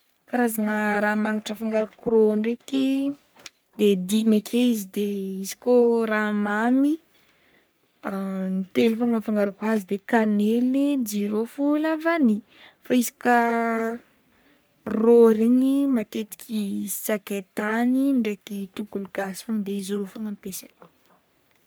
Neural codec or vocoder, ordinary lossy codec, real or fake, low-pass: codec, 44.1 kHz, 3.4 kbps, Pupu-Codec; none; fake; none